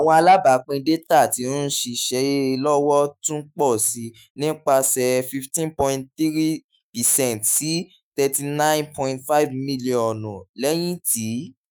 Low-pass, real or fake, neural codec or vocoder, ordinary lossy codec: none; fake; autoencoder, 48 kHz, 128 numbers a frame, DAC-VAE, trained on Japanese speech; none